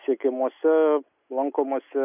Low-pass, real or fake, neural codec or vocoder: 3.6 kHz; real; none